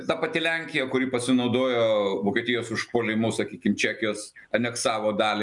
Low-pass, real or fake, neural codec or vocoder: 10.8 kHz; real; none